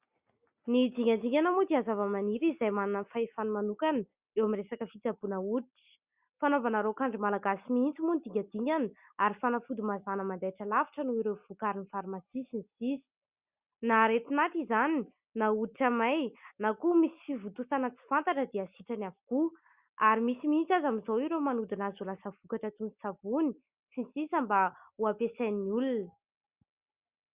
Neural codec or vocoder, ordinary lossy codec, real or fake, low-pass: none; Opus, 64 kbps; real; 3.6 kHz